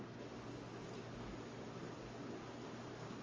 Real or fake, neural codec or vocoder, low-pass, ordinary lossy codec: real; none; 7.2 kHz; Opus, 32 kbps